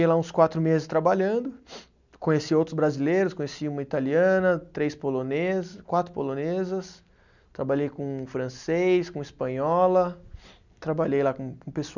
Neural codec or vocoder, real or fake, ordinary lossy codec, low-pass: none; real; none; 7.2 kHz